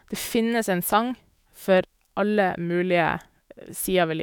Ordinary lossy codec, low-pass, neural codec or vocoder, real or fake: none; none; autoencoder, 48 kHz, 128 numbers a frame, DAC-VAE, trained on Japanese speech; fake